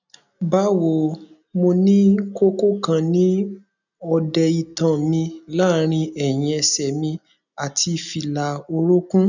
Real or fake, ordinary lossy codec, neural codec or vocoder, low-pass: real; none; none; 7.2 kHz